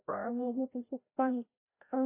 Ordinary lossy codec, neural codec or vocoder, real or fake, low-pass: AAC, 32 kbps; codec, 16 kHz, 0.5 kbps, FreqCodec, larger model; fake; 3.6 kHz